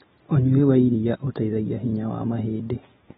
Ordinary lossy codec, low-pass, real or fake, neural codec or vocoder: AAC, 16 kbps; 19.8 kHz; real; none